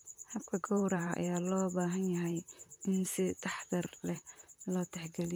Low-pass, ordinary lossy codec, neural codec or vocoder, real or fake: none; none; vocoder, 44.1 kHz, 128 mel bands, Pupu-Vocoder; fake